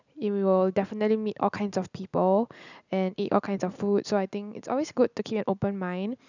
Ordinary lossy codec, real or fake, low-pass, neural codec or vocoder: none; real; 7.2 kHz; none